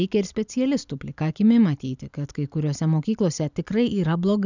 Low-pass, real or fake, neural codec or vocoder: 7.2 kHz; real; none